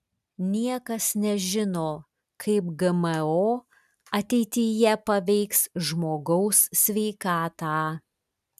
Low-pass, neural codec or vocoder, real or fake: 14.4 kHz; none; real